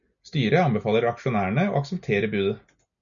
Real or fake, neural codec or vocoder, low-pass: real; none; 7.2 kHz